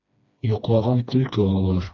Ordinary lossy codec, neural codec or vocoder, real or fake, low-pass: AAC, 48 kbps; codec, 16 kHz, 2 kbps, FreqCodec, smaller model; fake; 7.2 kHz